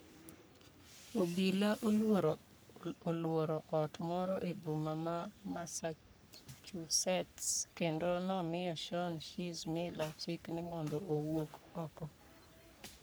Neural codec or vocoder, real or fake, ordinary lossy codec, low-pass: codec, 44.1 kHz, 3.4 kbps, Pupu-Codec; fake; none; none